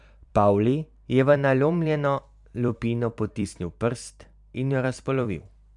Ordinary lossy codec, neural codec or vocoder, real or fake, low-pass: MP3, 96 kbps; vocoder, 44.1 kHz, 128 mel bands every 256 samples, BigVGAN v2; fake; 10.8 kHz